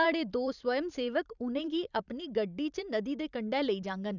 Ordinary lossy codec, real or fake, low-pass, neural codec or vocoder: none; fake; 7.2 kHz; vocoder, 44.1 kHz, 128 mel bands every 512 samples, BigVGAN v2